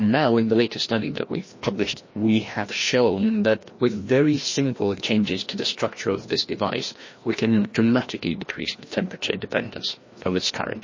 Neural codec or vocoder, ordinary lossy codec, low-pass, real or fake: codec, 16 kHz, 1 kbps, FreqCodec, larger model; MP3, 32 kbps; 7.2 kHz; fake